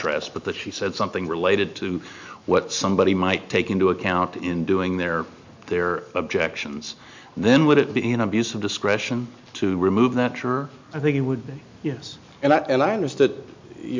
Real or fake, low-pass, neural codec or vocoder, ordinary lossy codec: real; 7.2 kHz; none; MP3, 64 kbps